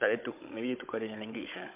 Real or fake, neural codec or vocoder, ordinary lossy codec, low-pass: fake; codec, 16 kHz, 16 kbps, FunCodec, trained on Chinese and English, 50 frames a second; MP3, 32 kbps; 3.6 kHz